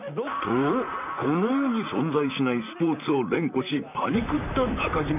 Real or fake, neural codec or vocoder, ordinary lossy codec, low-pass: fake; vocoder, 44.1 kHz, 128 mel bands, Pupu-Vocoder; none; 3.6 kHz